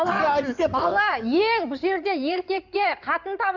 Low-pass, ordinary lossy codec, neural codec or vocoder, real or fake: 7.2 kHz; none; codec, 16 kHz in and 24 kHz out, 2.2 kbps, FireRedTTS-2 codec; fake